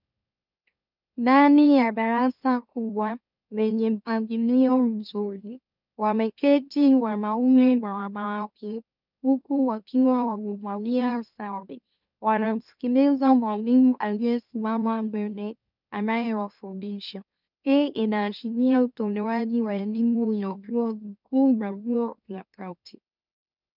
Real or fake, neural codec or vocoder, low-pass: fake; autoencoder, 44.1 kHz, a latent of 192 numbers a frame, MeloTTS; 5.4 kHz